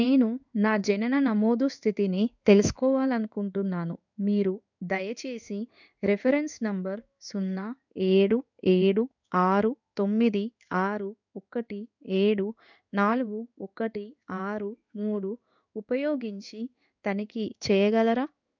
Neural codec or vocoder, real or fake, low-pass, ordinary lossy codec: codec, 16 kHz in and 24 kHz out, 1 kbps, XY-Tokenizer; fake; 7.2 kHz; none